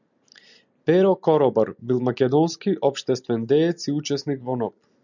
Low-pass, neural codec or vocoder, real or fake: 7.2 kHz; none; real